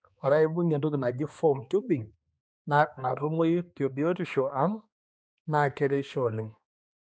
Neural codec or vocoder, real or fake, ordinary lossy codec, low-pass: codec, 16 kHz, 2 kbps, X-Codec, HuBERT features, trained on balanced general audio; fake; none; none